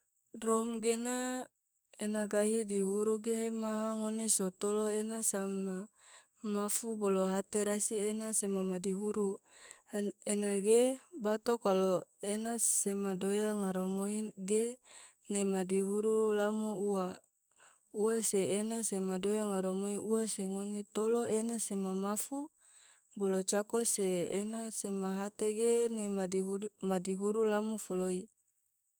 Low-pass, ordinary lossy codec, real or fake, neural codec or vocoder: none; none; fake; codec, 44.1 kHz, 2.6 kbps, SNAC